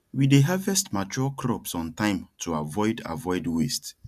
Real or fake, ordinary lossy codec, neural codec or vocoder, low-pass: real; none; none; 14.4 kHz